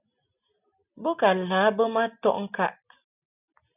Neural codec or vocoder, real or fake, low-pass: none; real; 3.6 kHz